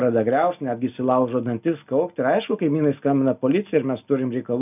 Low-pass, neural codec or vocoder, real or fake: 3.6 kHz; none; real